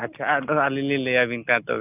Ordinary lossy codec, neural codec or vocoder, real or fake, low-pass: none; none; real; 3.6 kHz